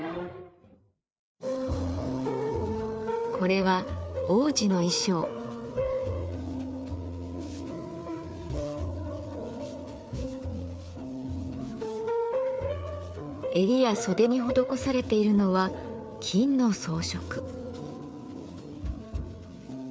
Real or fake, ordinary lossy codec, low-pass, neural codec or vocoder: fake; none; none; codec, 16 kHz, 4 kbps, FreqCodec, larger model